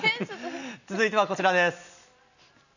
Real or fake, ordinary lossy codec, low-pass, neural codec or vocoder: real; none; 7.2 kHz; none